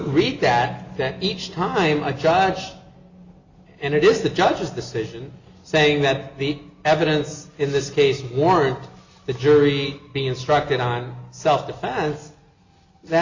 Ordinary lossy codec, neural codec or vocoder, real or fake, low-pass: Opus, 64 kbps; none; real; 7.2 kHz